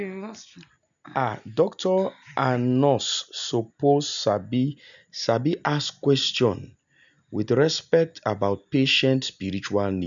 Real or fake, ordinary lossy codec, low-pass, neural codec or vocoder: real; MP3, 96 kbps; 7.2 kHz; none